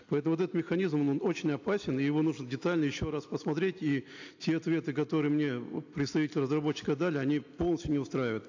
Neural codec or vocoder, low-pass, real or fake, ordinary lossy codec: none; 7.2 kHz; real; AAC, 48 kbps